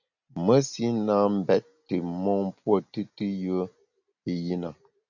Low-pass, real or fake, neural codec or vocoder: 7.2 kHz; real; none